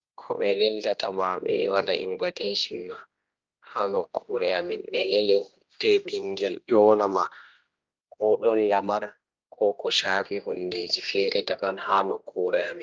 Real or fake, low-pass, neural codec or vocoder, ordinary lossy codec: fake; 7.2 kHz; codec, 16 kHz, 1 kbps, X-Codec, HuBERT features, trained on general audio; Opus, 24 kbps